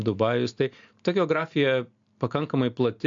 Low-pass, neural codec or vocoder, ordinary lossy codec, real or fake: 7.2 kHz; none; AAC, 64 kbps; real